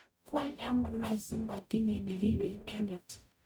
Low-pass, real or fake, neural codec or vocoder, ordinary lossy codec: none; fake; codec, 44.1 kHz, 0.9 kbps, DAC; none